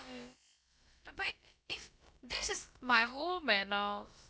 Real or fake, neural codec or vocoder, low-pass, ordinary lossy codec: fake; codec, 16 kHz, about 1 kbps, DyCAST, with the encoder's durations; none; none